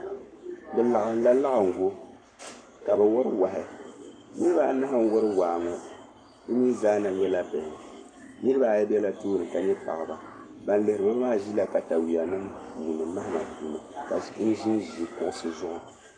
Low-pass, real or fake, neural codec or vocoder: 9.9 kHz; fake; codec, 44.1 kHz, 7.8 kbps, Pupu-Codec